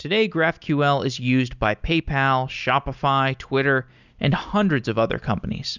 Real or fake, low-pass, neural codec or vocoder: real; 7.2 kHz; none